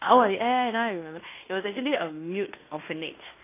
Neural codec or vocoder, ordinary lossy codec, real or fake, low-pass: codec, 16 kHz in and 24 kHz out, 0.9 kbps, LongCat-Audio-Codec, fine tuned four codebook decoder; AAC, 24 kbps; fake; 3.6 kHz